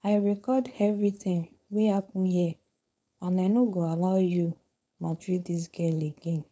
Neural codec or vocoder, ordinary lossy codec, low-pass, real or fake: codec, 16 kHz, 4.8 kbps, FACodec; none; none; fake